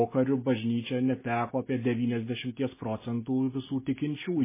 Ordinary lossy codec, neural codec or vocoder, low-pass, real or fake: MP3, 16 kbps; none; 3.6 kHz; real